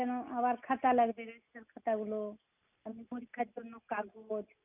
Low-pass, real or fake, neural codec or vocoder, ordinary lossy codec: 3.6 kHz; real; none; none